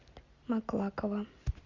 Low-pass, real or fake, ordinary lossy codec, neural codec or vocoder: 7.2 kHz; real; Opus, 64 kbps; none